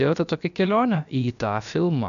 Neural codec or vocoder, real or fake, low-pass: codec, 16 kHz, about 1 kbps, DyCAST, with the encoder's durations; fake; 7.2 kHz